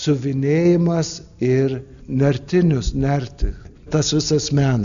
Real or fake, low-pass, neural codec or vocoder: real; 7.2 kHz; none